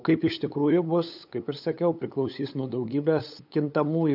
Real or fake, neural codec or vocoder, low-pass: fake; codec, 16 kHz, 8 kbps, FunCodec, trained on LibriTTS, 25 frames a second; 5.4 kHz